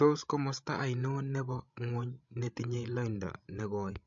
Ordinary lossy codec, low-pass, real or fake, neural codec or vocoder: MP3, 48 kbps; 7.2 kHz; fake; codec, 16 kHz, 16 kbps, FreqCodec, larger model